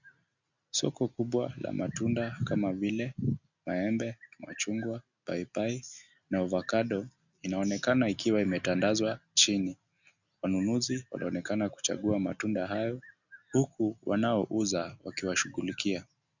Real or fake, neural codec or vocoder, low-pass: real; none; 7.2 kHz